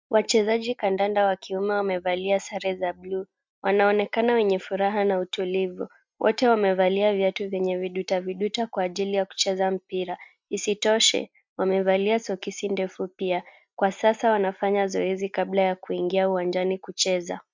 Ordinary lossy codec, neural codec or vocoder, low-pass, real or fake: MP3, 64 kbps; none; 7.2 kHz; real